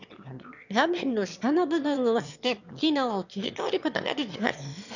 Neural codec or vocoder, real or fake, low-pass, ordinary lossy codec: autoencoder, 22.05 kHz, a latent of 192 numbers a frame, VITS, trained on one speaker; fake; 7.2 kHz; none